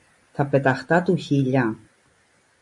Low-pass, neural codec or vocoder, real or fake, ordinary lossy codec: 10.8 kHz; none; real; MP3, 48 kbps